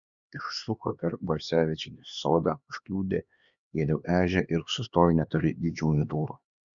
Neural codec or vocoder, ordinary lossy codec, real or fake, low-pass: codec, 16 kHz, 2 kbps, X-Codec, HuBERT features, trained on LibriSpeech; Opus, 64 kbps; fake; 7.2 kHz